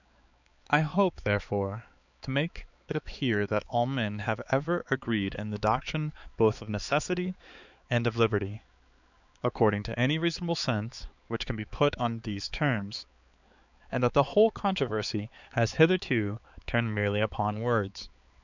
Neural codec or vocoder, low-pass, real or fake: codec, 16 kHz, 4 kbps, X-Codec, HuBERT features, trained on balanced general audio; 7.2 kHz; fake